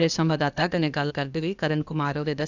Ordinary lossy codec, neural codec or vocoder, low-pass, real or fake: none; codec, 16 kHz, 0.8 kbps, ZipCodec; 7.2 kHz; fake